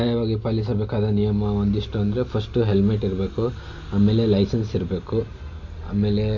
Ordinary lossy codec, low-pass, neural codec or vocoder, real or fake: none; 7.2 kHz; none; real